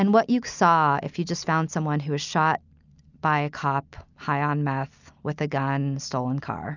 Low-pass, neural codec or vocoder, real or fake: 7.2 kHz; none; real